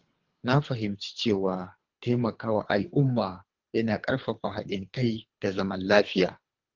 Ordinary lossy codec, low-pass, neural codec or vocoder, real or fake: Opus, 32 kbps; 7.2 kHz; codec, 24 kHz, 3 kbps, HILCodec; fake